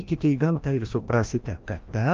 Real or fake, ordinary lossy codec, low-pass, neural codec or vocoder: fake; Opus, 24 kbps; 7.2 kHz; codec, 16 kHz, 1 kbps, FreqCodec, larger model